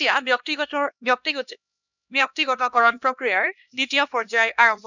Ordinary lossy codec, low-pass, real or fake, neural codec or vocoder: none; 7.2 kHz; fake; codec, 16 kHz, 1 kbps, X-Codec, HuBERT features, trained on LibriSpeech